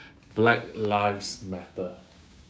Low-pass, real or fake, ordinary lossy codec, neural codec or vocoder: none; fake; none; codec, 16 kHz, 6 kbps, DAC